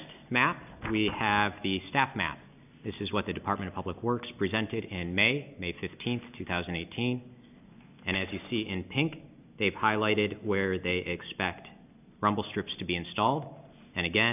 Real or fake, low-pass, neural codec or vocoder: real; 3.6 kHz; none